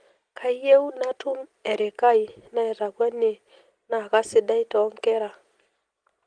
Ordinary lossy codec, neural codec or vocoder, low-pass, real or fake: Opus, 24 kbps; none; 9.9 kHz; real